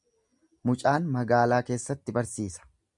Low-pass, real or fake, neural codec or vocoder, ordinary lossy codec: 10.8 kHz; real; none; MP3, 96 kbps